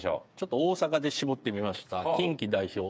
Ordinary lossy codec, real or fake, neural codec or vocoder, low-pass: none; fake; codec, 16 kHz, 8 kbps, FreqCodec, smaller model; none